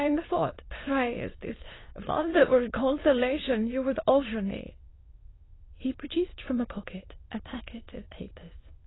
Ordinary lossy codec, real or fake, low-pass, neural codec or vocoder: AAC, 16 kbps; fake; 7.2 kHz; autoencoder, 22.05 kHz, a latent of 192 numbers a frame, VITS, trained on many speakers